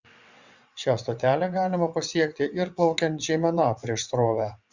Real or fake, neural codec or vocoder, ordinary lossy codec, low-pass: fake; vocoder, 22.05 kHz, 80 mel bands, WaveNeXt; Opus, 64 kbps; 7.2 kHz